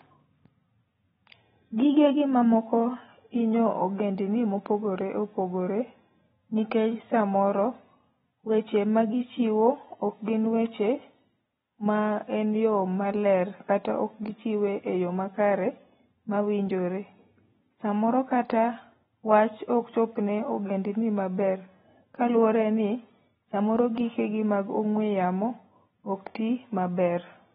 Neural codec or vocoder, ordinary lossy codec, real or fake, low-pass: none; AAC, 16 kbps; real; 19.8 kHz